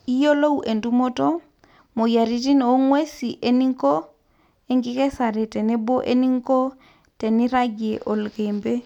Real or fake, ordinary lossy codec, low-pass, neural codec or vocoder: real; none; 19.8 kHz; none